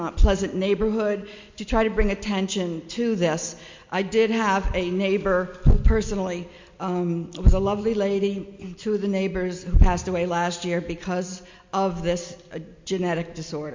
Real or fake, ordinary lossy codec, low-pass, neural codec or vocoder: real; MP3, 48 kbps; 7.2 kHz; none